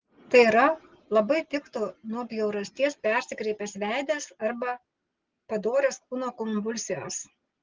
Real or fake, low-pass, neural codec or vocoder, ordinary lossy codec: real; 7.2 kHz; none; Opus, 16 kbps